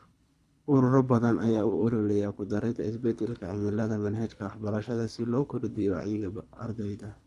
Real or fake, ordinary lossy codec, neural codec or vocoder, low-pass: fake; none; codec, 24 kHz, 3 kbps, HILCodec; none